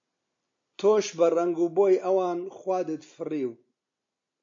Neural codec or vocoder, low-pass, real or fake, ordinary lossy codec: none; 7.2 kHz; real; AAC, 48 kbps